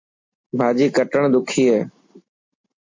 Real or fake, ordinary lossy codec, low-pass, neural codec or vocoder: real; MP3, 48 kbps; 7.2 kHz; none